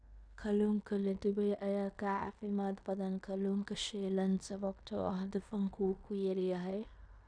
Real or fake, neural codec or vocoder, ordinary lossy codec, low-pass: fake; codec, 16 kHz in and 24 kHz out, 0.9 kbps, LongCat-Audio-Codec, fine tuned four codebook decoder; Opus, 64 kbps; 9.9 kHz